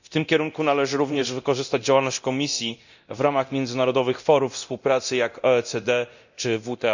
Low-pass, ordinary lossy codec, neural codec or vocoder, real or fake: 7.2 kHz; none; codec, 24 kHz, 0.9 kbps, DualCodec; fake